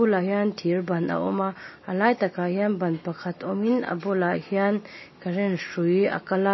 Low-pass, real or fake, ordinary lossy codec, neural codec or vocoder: 7.2 kHz; real; MP3, 24 kbps; none